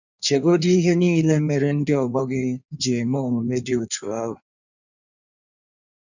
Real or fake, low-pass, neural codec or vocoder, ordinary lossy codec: fake; 7.2 kHz; codec, 16 kHz in and 24 kHz out, 1.1 kbps, FireRedTTS-2 codec; none